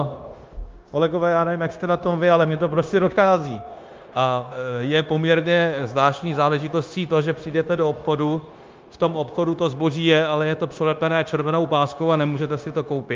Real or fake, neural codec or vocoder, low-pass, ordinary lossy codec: fake; codec, 16 kHz, 0.9 kbps, LongCat-Audio-Codec; 7.2 kHz; Opus, 24 kbps